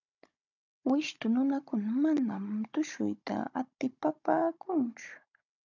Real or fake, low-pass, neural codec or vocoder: fake; 7.2 kHz; codec, 16 kHz, 16 kbps, FunCodec, trained on Chinese and English, 50 frames a second